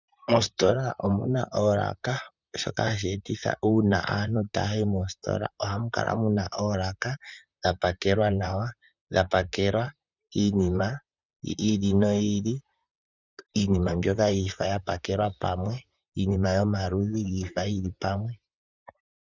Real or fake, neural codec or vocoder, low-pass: fake; vocoder, 44.1 kHz, 128 mel bands, Pupu-Vocoder; 7.2 kHz